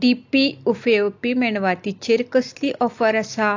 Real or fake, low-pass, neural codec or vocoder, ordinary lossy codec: real; 7.2 kHz; none; AAC, 48 kbps